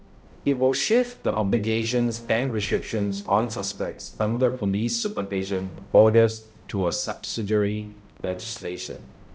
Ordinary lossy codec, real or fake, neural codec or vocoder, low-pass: none; fake; codec, 16 kHz, 0.5 kbps, X-Codec, HuBERT features, trained on balanced general audio; none